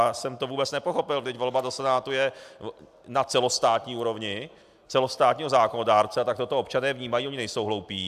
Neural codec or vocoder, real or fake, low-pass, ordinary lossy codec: none; real; 14.4 kHz; AAC, 96 kbps